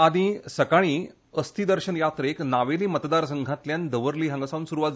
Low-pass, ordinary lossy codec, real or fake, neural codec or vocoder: none; none; real; none